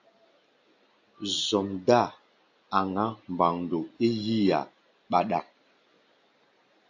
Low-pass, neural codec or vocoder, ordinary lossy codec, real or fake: 7.2 kHz; none; AAC, 48 kbps; real